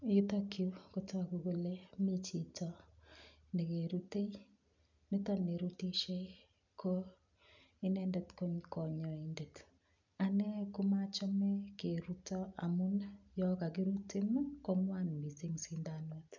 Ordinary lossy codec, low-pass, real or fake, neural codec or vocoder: none; 7.2 kHz; real; none